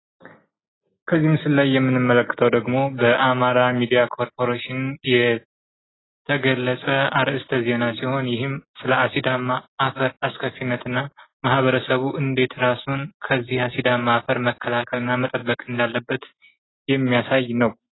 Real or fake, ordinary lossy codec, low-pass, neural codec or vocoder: real; AAC, 16 kbps; 7.2 kHz; none